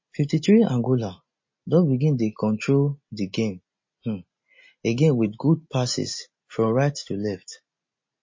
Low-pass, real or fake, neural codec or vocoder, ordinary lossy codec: 7.2 kHz; real; none; MP3, 32 kbps